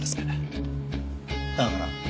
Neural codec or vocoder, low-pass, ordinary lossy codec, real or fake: none; none; none; real